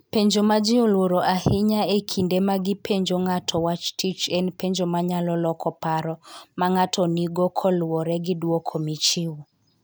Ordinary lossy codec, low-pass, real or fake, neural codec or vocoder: none; none; real; none